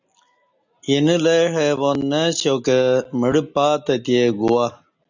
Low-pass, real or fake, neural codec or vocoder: 7.2 kHz; real; none